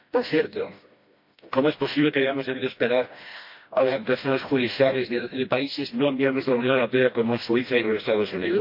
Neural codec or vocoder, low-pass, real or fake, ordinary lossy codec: codec, 16 kHz, 1 kbps, FreqCodec, smaller model; 5.4 kHz; fake; MP3, 32 kbps